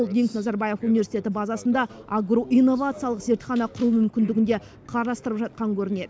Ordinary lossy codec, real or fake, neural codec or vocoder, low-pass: none; real; none; none